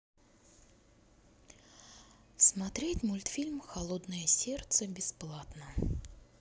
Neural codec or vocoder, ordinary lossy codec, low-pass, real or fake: none; none; none; real